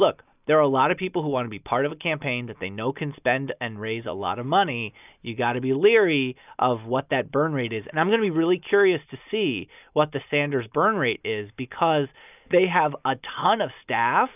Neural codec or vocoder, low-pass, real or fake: none; 3.6 kHz; real